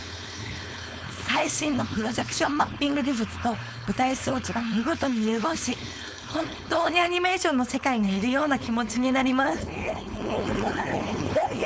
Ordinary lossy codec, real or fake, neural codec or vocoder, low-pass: none; fake; codec, 16 kHz, 4.8 kbps, FACodec; none